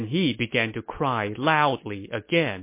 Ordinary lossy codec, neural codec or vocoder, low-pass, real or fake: MP3, 24 kbps; none; 3.6 kHz; real